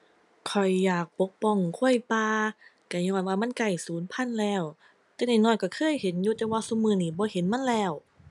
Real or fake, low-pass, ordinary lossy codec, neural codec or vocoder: real; 10.8 kHz; none; none